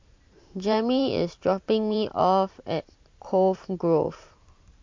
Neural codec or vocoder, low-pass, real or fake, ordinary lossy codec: vocoder, 44.1 kHz, 128 mel bands every 512 samples, BigVGAN v2; 7.2 kHz; fake; MP3, 48 kbps